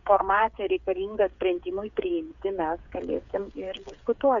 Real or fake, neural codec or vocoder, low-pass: fake; codec, 16 kHz, 8 kbps, FreqCodec, smaller model; 7.2 kHz